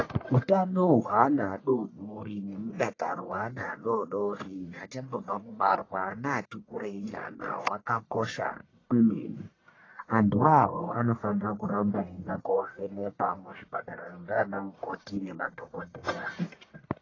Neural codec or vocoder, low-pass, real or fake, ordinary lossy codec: codec, 44.1 kHz, 1.7 kbps, Pupu-Codec; 7.2 kHz; fake; AAC, 32 kbps